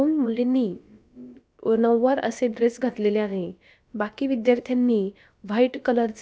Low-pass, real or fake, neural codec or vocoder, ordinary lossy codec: none; fake; codec, 16 kHz, about 1 kbps, DyCAST, with the encoder's durations; none